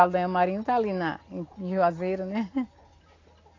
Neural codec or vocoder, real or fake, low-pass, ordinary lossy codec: none; real; 7.2 kHz; AAC, 32 kbps